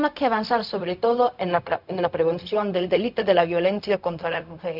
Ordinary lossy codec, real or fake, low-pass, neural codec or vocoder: none; fake; 5.4 kHz; codec, 16 kHz, 0.4 kbps, LongCat-Audio-Codec